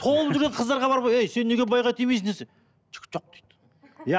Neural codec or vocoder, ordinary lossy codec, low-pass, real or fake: none; none; none; real